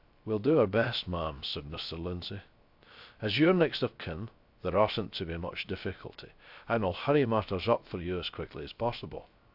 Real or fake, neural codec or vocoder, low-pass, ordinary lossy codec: fake; codec, 16 kHz, 0.3 kbps, FocalCodec; 5.4 kHz; AAC, 48 kbps